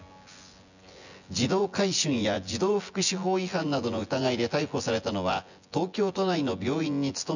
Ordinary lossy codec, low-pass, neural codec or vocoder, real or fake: none; 7.2 kHz; vocoder, 24 kHz, 100 mel bands, Vocos; fake